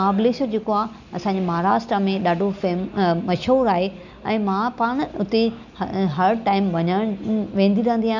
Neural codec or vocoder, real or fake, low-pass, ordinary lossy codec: none; real; 7.2 kHz; none